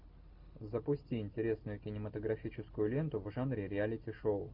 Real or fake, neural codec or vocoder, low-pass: real; none; 5.4 kHz